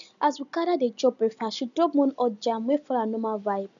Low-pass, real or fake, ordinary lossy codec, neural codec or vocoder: 7.2 kHz; real; none; none